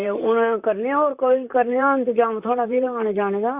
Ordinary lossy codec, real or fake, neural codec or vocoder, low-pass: Opus, 64 kbps; fake; vocoder, 44.1 kHz, 128 mel bands, Pupu-Vocoder; 3.6 kHz